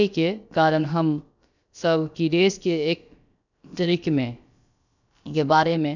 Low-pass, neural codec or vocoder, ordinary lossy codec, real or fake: 7.2 kHz; codec, 16 kHz, about 1 kbps, DyCAST, with the encoder's durations; none; fake